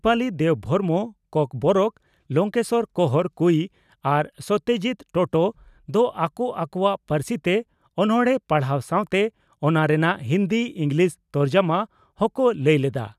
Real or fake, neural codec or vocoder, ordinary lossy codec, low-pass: real; none; none; 14.4 kHz